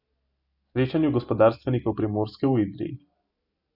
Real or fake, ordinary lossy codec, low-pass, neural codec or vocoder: real; none; 5.4 kHz; none